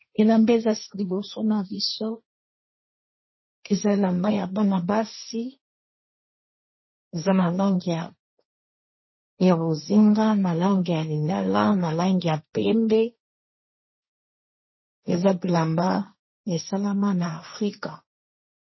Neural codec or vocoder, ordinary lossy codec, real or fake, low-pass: codec, 16 kHz, 1.1 kbps, Voila-Tokenizer; MP3, 24 kbps; fake; 7.2 kHz